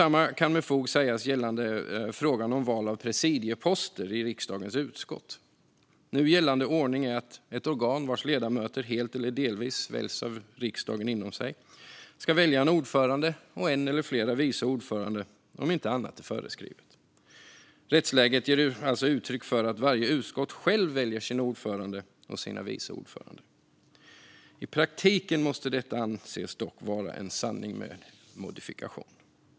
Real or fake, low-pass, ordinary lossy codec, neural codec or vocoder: real; none; none; none